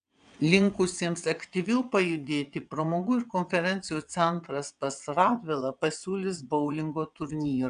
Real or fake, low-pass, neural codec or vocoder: fake; 9.9 kHz; vocoder, 22.05 kHz, 80 mel bands, WaveNeXt